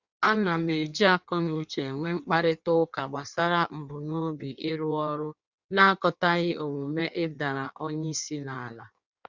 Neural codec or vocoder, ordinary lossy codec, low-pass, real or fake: codec, 16 kHz in and 24 kHz out, 1.1 kbps, FireRedTTS-2 codec; Opus, 64 kbps; 7.2 kHz; fake